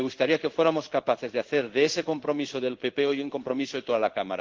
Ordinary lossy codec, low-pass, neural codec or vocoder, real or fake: Opus, 16 kbps; 7.2 kHz; codec, 16 kHz in and 24 kHz out, 1 kbps, XY-Tokenizer; fake